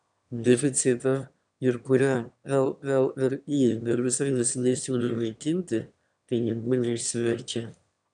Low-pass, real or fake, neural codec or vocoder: 9.9 kHz; fake; autoencoder, 22.05 kHz, a latent of 192 numbers a frame, VITS, trained on one speaker